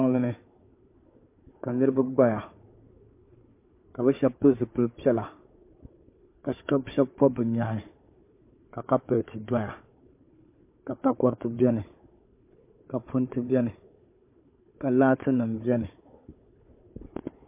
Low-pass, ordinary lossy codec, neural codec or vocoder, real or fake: 3.6 kHz; MP3, 24 kbps; codec, 16 kHz, 4 kbps, FunCodec, trained on Chinese and English, 50 frames a second; fake